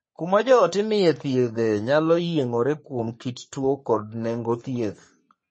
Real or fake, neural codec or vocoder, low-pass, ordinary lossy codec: fake; codec, 44.1 kHz, 3.4 kbps, Pupu-Codec; 10.8 kHz; MP3, 32 kbps